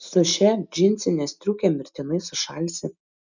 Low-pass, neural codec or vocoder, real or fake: 7.2 kHz; none; real